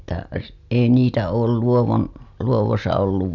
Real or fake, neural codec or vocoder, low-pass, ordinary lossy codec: real; none; 7.2 kHz; none